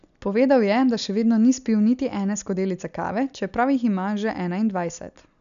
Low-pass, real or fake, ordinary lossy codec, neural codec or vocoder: 7.2 kHz; real; none; none